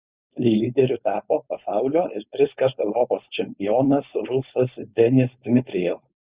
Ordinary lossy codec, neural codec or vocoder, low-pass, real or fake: Opus, 64 kbps; codec, 16 kHz, 4.8 kbps, FACodec; 3.6 kHz; fake